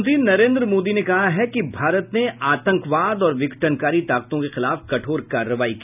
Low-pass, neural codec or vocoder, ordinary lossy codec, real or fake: 3.6 kHz; none; none; real